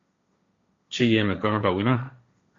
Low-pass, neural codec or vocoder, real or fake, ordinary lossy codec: 7.2 kHz; codec, 16 kHz, 1.1 kbps, Voila-Tokenizer; fake; MP3, 48 kbps